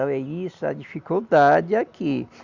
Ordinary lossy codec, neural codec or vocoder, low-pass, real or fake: Opus, 64 kbps; none; 7.2 kHz; real